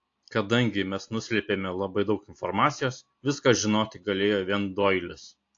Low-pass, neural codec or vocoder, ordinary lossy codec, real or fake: 7.2 kHz; none; AAC, 48 kbps; real